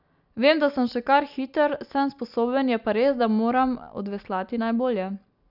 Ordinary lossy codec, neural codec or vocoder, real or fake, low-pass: none; none; real; 5.4 kHz